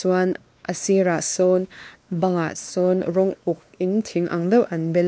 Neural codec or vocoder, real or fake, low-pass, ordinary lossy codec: codec, 16 kHz, 2 kbps, X-Codec, WavLM features, trained on Multilingual LibriSpeech; fake; none; none